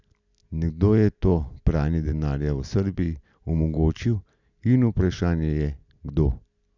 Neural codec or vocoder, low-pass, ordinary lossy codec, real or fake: none; 7.2 kHz; none; real